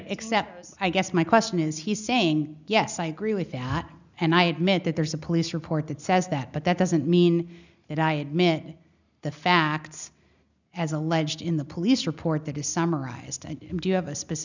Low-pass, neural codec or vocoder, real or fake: 7.2 kHz; none; real